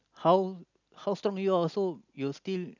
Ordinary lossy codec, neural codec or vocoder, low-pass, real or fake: none; none; 7.2 kHz; real